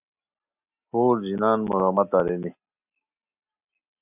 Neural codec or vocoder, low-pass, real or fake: none; 3.6 kHz; real